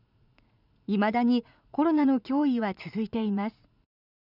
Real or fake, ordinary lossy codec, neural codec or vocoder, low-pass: fake; none; vocoder, 44.1 kHz, 80 mel bands, Vocos; 5.4 kHz